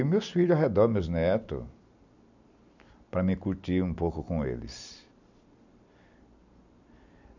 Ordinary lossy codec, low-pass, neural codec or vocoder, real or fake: none; 7.2 kHz; none; real